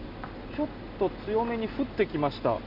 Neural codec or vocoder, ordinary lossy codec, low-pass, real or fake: none; MP3, 48 kbps; 5.4 kHz; real